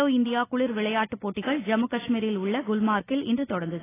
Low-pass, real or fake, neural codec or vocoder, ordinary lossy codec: 3.6 kHz; real; none; AAC, 16 kbps